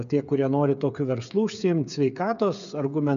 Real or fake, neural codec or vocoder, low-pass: fake; codec, 16 kHz, 8 kbps, FreqCodec, smaller model; 7.2 kHz